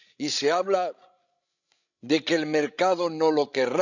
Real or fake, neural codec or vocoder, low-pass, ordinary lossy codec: fake; codec, 16 kHz, 16 kbps, FreqCodec, larger model; 7.2 kHz; none